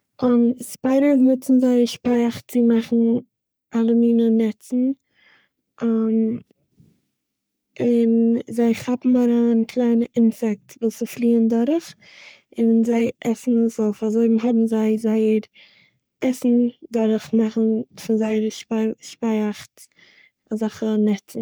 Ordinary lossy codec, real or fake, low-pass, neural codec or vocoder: none; fake; none; codec, 44.1 kHz, 3.4 kbps, Pupu-Codec